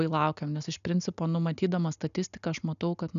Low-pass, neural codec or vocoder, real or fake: 7.2 kHz; none; real